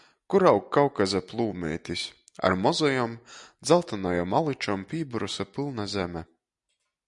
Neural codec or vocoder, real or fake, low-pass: none; real; 10.8 kHz